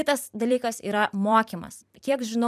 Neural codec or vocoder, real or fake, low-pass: vocoder, 44.1 kHz, 128 mel bands every 256 samples, BigVGAN v2; fake; 14.4 kHz